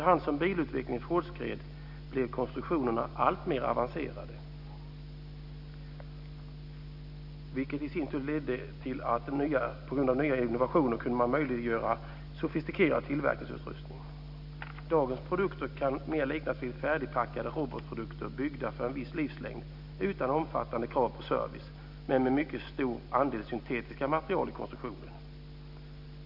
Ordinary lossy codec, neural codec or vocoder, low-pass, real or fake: none; none; 5.4 kHz; real